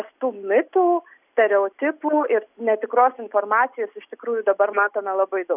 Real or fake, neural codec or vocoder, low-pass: real; none; 3.6 kHz